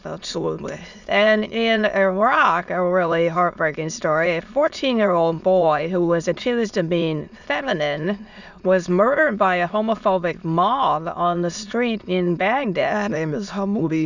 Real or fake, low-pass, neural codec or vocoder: fake; 7.2 kHz; autoencoder, 22.05 kHz, a latent of 192 numbers a frame, VITS, trained on many speakers